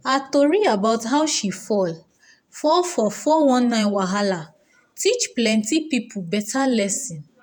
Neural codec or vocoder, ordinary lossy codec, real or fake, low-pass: vocoder, 48 kHz, 128 mel bands, Vocos; none; fake; none